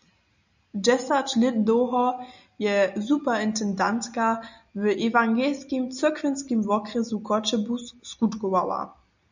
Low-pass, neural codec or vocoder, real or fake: 7.2 kHz; none; real